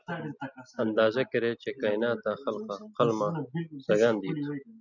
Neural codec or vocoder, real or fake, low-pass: none; real; 7.2 kHz